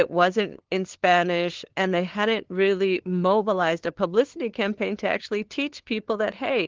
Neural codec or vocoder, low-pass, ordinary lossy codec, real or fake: codec, 16 kHz, 2 kbps, FunCodec, trained on LibriTTS, 25 frames a second; 7.2 kHz; Opus, 16 kbps; fake